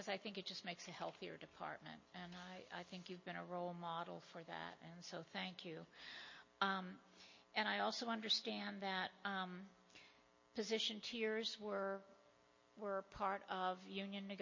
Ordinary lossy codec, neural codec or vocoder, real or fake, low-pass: MP3, 32 kbps; none; real; 7.2 kHz